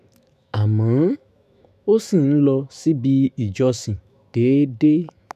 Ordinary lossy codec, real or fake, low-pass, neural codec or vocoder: none; fake; 14.4 kHz; autoencoder, 48 kHz, 128 numbers a frame, DAC-VAE, trained on Japanese speech